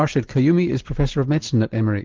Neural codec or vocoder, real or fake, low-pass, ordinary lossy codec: none; real; 7.2 kHz; Opus, 16 kbps